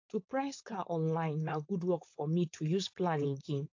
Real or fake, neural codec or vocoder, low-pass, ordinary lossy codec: fake; codec, 16 kHz, 4.8 kbps, FACodec; 7.2 kHz; AAC, 48 kbps